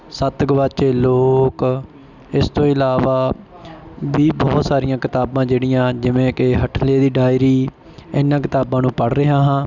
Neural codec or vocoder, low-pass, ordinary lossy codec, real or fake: none; 7.2 kHz; none; real